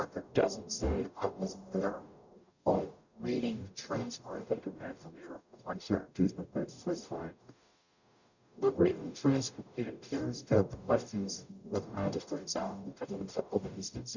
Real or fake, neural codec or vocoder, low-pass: fake; codec, 44.1 kHz, 0.9 kbps, DAC; 7.2 kHz